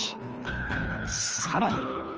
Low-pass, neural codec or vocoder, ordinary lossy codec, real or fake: 7.2 kHz; codec, 24 kHz, 3 kbps, HILCodec; Opus, 24 kbps; fake